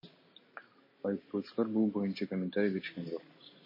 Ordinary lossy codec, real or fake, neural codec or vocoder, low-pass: MP3, 24 kbps; real; none; 5.4 kHz